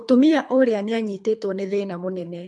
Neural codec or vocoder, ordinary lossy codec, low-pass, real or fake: codec, 24 kHz, 3 kbps, HILCodec; MP3, 64 kbps; 10.8 kHz; fake